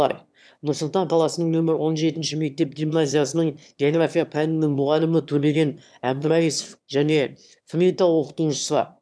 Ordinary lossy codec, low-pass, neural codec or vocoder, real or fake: none; none; autoencoder, 22.05 kHz, a latent of 192 numbers a frame, VITS, trained on one speaker; fake